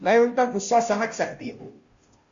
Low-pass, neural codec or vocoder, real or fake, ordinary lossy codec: 7.2 kHz; codec, 16 kHz, 0.5 kbps, FunCodec, trained on Chinese and English, 25 frames a second; fake; Opus, 64 kbps